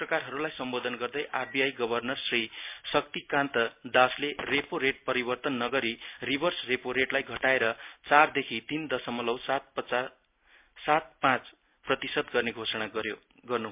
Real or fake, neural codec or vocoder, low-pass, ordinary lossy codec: real; none; 3.6 kHz; MP3, 32 kbps